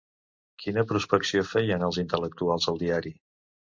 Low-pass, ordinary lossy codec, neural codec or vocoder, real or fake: 7.2 kHz; MP3, 64 kbps; none; real